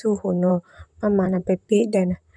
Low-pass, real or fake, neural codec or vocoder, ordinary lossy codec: 9.9 kHz; fake; vocoder, 44.1 kHz, 128 mel bands, Pupu-Vocoder; none